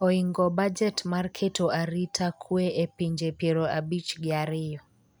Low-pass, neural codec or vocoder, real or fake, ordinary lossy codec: none; none; real; none